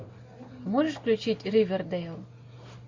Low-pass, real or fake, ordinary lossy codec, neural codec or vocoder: 7.2 kHz; real; MP3, 48 kbps; none